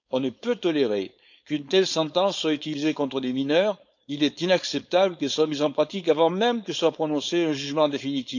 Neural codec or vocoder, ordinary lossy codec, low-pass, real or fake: codec, 16 kHz, 4.8 kbps, FACodec; none; 7.2 kHz; fake